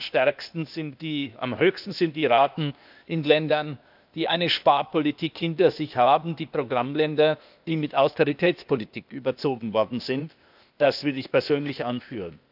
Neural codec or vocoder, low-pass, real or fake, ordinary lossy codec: codec, 16 kHz, 0.8 kbps, ZipCodec; 5.4 kHz; fake; none